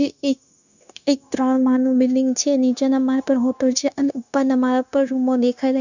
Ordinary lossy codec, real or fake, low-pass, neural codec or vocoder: none; fake; 7.2 kHz; codec, 16 kHz, 0.9 kbps, LongCat-Audio-Codec